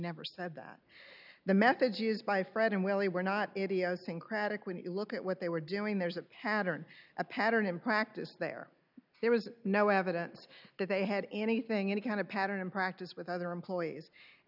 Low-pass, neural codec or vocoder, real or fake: 5.4 kHz; none; real